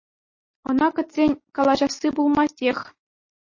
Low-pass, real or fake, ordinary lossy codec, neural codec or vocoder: 7.2 kHz; real; MP3, 32 kbps; none